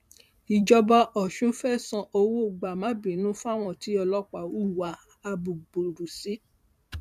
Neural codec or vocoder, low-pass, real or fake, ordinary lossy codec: vocoder, 44.1 kHz, 128 mel bands every 512 samples, BigVGAN v2; 14.4 kHz; fake; none